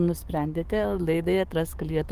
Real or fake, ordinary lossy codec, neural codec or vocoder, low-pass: fake; Opus, 24 kbps; codec, 44.1 kHz, 7.8 kbps, DAC; 14.4 kHz